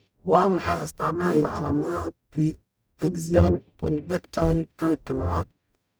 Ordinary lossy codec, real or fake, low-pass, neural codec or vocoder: none; fake; none; codec, 44.1 kHz, 0.9 kbps, DAC